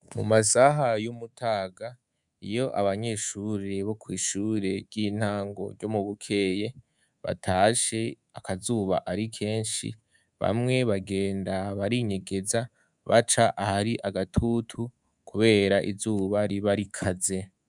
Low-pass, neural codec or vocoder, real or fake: 10.8 kHz; codec, 24 kHz, 3.1 kbps, DualCodec; fake